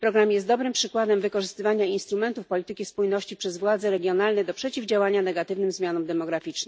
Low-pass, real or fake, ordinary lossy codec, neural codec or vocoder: none; real; none; none